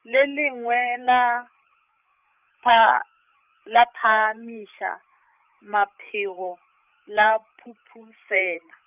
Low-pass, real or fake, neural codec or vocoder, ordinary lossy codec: 3.6 kHz; fake; codec, 16 kHz, 8 kbps, FreqCodec, larger model; none